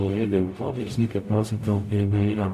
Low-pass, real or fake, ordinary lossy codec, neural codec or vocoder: 14.4 kHz; fake; AAC, 64 kbps; codec, 44.1 kHz, 0.9 kbps, DAC